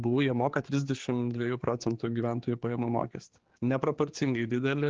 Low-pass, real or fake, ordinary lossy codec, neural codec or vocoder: 7.2 kHz; fake; Opus, 16 kbps; codec, 16 kHz, 4 kbps, X-Codec, HuBERT features, trained on general audio